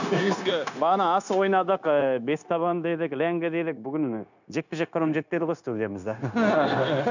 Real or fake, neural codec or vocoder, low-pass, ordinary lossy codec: fake; codec, 16 kHz, 0.9 kbps, LongCat-Audio-Codec; 7.2 kHz; none